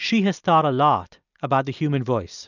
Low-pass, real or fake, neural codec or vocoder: 7.2 kHz; real; none